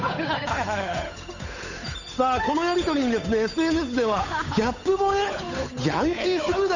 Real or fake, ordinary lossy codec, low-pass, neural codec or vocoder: fake; none; 7.2 kHz; codec, 16 kHz, 8 kbps, FunCodec, trained on Chinese and English, 25 frames a second